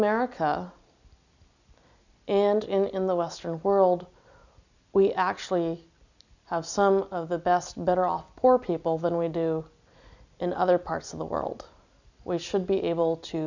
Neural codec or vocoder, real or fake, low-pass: none; real; 7.2 kHz